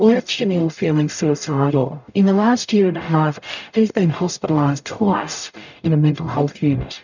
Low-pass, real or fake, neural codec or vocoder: 7.2 kHz; fake; codec, 44.1 kHz, 0.9 kbps, DAC